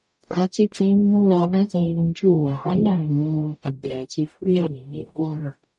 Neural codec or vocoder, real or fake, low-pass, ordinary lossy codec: codec, 44.1 kHz, 0.9 kbps, DAC; fake; 10.8 kHz; none